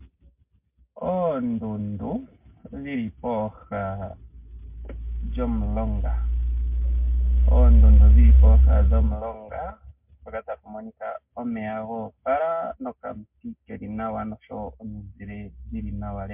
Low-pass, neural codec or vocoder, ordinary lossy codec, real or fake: 3.6 kHz; none; MP3, 32 kbps; real